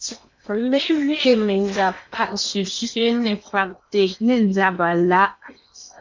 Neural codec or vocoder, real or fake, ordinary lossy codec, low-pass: codec, 16 kHz in and 24 kHz out, 0.8 kbps, FocalCodec, streaming, 65536 codes; fake; MP3, 64 kbps; 7.2 kHz